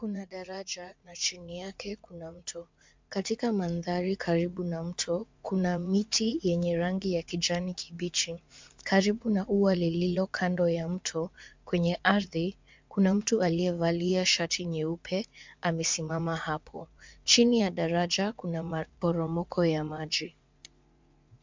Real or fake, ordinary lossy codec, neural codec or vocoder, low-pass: fake; MP3, 64 kbps; vocoder, 44.1 kHz, 80 mel bands, Vocos; 7.2 kHz